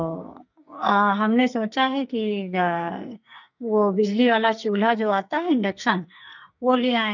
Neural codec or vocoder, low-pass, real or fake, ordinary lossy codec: codec, 44.1 kHz, 2.6 kbps, SNAC; 7.2 kHz; fake; none